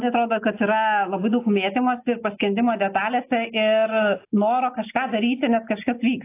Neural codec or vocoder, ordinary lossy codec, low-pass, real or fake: none; AAC, 24 kbps; 3.6 kHz; real